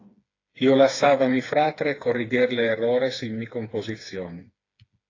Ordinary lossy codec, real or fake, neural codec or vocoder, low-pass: AAC, 32 kbps; fake; codec, 16 kHz, 4 kbps, FreqCodec, smaller model; 7.2 kHz